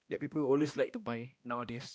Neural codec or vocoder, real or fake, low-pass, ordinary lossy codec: codec, 16 kHz, 1 kbps, X-Codec, HuBERT features, trained on balanced general audio; fake; none; none